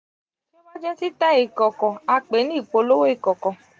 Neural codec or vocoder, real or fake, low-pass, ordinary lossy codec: none; real; none; none